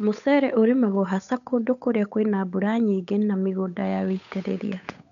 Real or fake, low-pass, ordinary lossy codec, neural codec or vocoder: fake; 7.2 kHz; none; codec, 16 kHz, 8 kbps, FunCodec, trained on Chinese and English, 25 frames a second